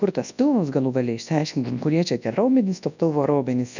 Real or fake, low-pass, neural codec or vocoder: fake; 7.2 kHz; codec, 24 kHz, 0.9 kbps, WavTokenizer, large speech release